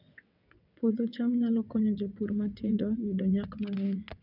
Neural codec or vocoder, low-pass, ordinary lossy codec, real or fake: vocoder, 22.05 kHz, 80 mel bands, WaveNeXt; 5.4 kHz; none; fake